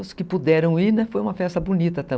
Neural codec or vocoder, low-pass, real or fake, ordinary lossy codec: none; none; real; none